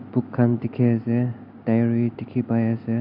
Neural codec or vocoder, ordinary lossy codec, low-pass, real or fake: none; AAC, 48 kbps; 5.4 kHz; real